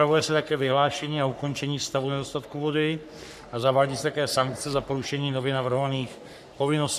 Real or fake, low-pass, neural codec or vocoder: fake; 14.4 kHz; codec, 44.1 kHz, 3.4 kbps, Pupu-Codec